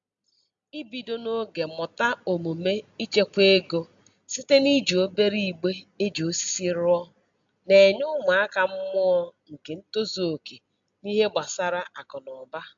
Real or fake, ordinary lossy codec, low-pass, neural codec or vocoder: real; AAC, 64 kbps; 7.2 kHz; none